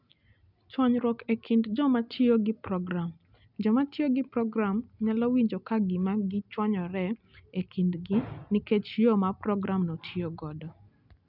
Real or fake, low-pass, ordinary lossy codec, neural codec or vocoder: real; 5.4 kHz; none; none